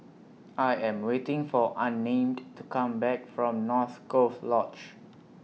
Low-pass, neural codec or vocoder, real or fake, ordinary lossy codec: none; none; real; none